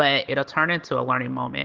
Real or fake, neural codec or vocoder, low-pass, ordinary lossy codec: real; none; 7.2 kHz; Opus, 16 kbps